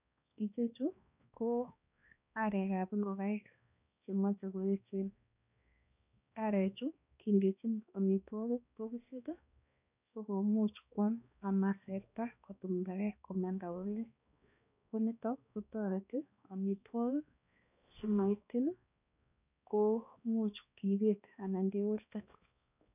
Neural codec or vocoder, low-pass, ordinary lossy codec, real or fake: codec, 16 kHz, 2 kbps, X-Codec, HuBERT features, trained on balanced general audio; 3.6 kHz; none; fake